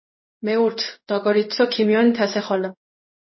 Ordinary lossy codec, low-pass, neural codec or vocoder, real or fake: MP3, 24 kbps; 7.2 kHz; codec, 16 kHz in and 24 kHz out, 1 kbps, XY-Tokenizer; fake